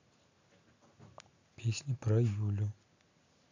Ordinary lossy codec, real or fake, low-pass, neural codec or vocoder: AAC, 48 kbps; real; 7.2 kHz; none